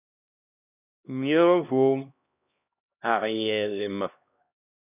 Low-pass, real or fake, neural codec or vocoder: 3.6 kHz; fake; codec, 16 kHz, 1 kbps, X-Codec, HuBERT features, trained on LibriSpeech